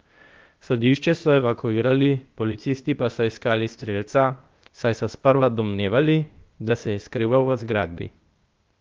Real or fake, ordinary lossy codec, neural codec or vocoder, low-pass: fake; Opus, 32 kbps; codec, 16 kHz, 0.8 kbps, ZipCodec; 7.2 kHz